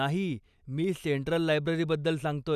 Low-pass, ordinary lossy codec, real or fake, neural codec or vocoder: 14.4 kHz; none; real; none